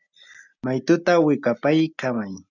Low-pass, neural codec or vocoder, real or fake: 7.2 kHz; none; real